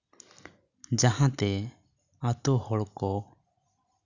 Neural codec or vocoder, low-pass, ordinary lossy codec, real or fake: none; 7.2 kHz; none; real